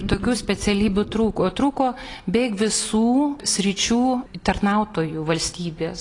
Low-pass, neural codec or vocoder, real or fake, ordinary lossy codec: 10.8 kHz; none; real; AAC, 32 kbps